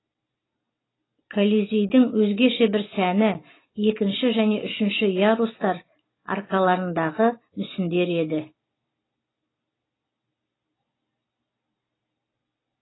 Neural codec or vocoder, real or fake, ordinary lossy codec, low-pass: none; real; AAC, 16 kbps; 7.2 kHz